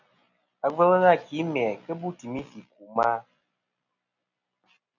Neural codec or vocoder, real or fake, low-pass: none; real; 7.2 kHz